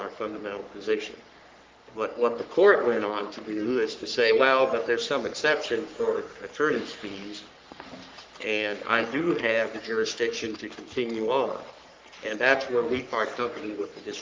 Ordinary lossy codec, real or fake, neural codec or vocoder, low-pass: Opus, 24 kbps; fake; codec, 44.1 kHz, 3.4 kbps, Pupu-Codec; 7.2 kHz